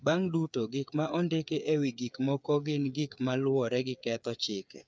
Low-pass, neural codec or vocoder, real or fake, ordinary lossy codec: none; codec, 16 kHz, 8 kbps, FreqCodec, smaller model; fake; none